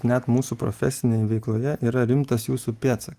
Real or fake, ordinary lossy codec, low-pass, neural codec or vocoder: real; Opus, 32 kbps; 14.4 kHz; none